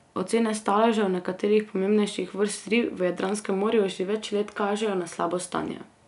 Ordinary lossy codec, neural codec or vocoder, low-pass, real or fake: none; none; 10.8 kHz; real